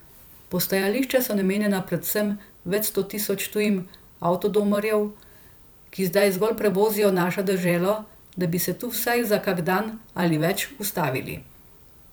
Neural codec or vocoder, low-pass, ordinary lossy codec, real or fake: vocoder, 44.1 kHz, 128 mel bands every 512 samples, BigVGAN v2; none; none; fake